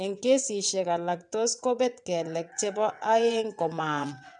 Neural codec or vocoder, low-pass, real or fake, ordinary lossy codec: vocoder, 22.05 kHz, 80 mel bands, WaveNeXt; 9.9 kHz; fake; none